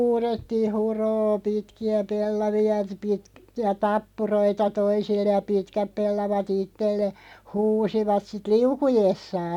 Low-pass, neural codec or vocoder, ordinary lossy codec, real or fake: 19.8 kHz; none; none; real